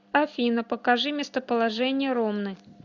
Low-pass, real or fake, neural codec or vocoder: 7.2 kHz; real; none